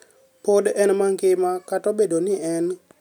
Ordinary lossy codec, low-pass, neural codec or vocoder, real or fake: none; 19.8 kHz; none; real